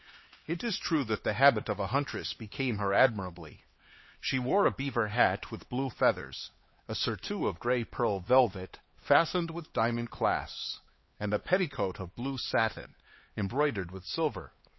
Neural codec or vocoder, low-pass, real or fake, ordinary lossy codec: codec, 16 kHz, 4 kbps, X-Codec, HuBERT features, trained on LibriSpeech; 7.2 kHz; fake; MP3, 24 kbps